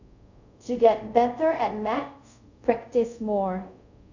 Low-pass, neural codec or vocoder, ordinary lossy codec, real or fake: 7.2 kHz; codec, 24 kHz, 0.5 kbps, DualCodec; none; fake